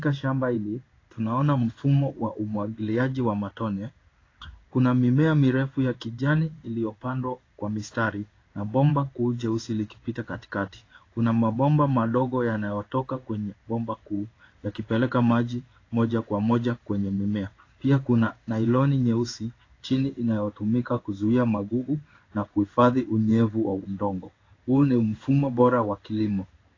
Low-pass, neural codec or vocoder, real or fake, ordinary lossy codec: 7.2 kHz; codec, 16 kHz in and 24 kHz out, 1 kbps, XY-Tokenizer; fake; AAC, 32 kbps